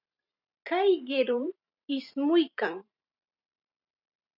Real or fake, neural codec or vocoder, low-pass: fake; vocoder, 44.1 kHz, 128 mel bands every 512 samples, BigVGAN v2; 5.4 kHz